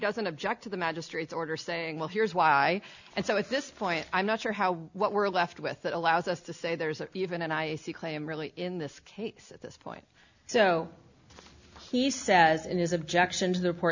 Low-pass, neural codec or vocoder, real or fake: 7.2 kHz; none; real